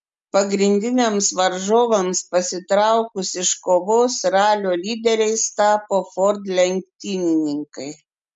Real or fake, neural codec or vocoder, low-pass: real; none; 10.8 kHz